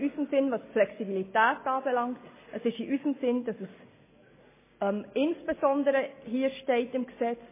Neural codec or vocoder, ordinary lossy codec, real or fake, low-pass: none; MP3, 16 kbps; real; 3.6 kHz